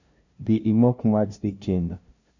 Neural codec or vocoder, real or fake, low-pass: codec, 16 kHz, 0.5 kbps, FunCodec, trained on LibriTTS, 25 frames a second; fake; 7.2 kHz